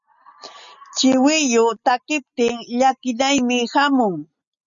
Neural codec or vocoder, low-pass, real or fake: none; 7.2 kHz; real